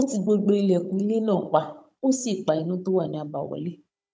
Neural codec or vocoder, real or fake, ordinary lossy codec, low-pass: codec, 16 kHz, 16 kbps, FunCodec, trained on Chinese and English, 50 frames a second; fake; none; none